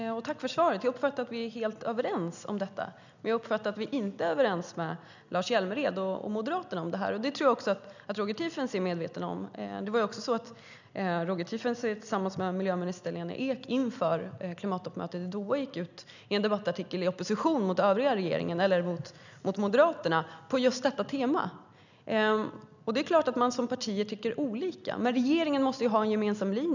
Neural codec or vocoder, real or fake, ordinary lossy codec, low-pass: none; real; none; 7.2 kHz